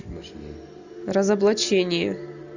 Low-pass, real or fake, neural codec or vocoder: 7.2 kHz; real; none